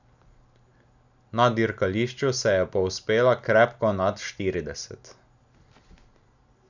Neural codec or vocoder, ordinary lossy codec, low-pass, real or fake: none; none; 7.2 kHz; real